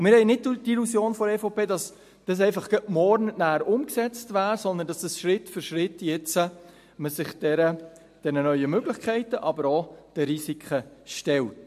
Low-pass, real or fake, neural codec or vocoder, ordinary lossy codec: 14.4 kHz; real; none; MP3, 64 kbps